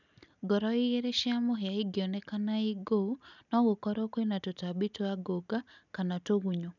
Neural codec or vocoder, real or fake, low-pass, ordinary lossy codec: none; real; 7.2 kHz; none